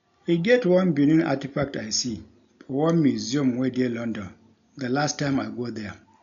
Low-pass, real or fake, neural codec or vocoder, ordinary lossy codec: 7.2 kHz; real; none; none